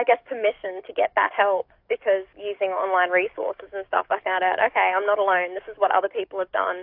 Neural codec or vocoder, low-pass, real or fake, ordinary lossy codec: codec, 44.1 kHz, 7.8 kbps, DAC; 5.4 kHz; fake; AAC, 48 kbps